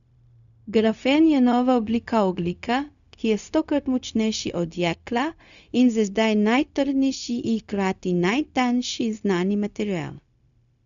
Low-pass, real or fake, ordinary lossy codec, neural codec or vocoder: 7.2 kHz; fake; none; codec, 16 kHz, 0.4 kbps, LongCat-Audio-Codec